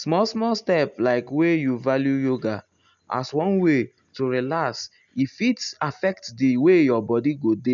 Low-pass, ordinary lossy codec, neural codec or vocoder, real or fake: 7.2 kHz; none; none; real